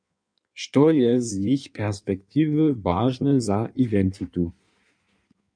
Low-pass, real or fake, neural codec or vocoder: 9.9 kHz; fake; codec, 16 kHz in and 24 kHz out, 1.1 kbps, FireRedTTS-2 codec